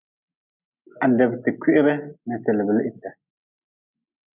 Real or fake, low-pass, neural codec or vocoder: real; 3.6 kHz; none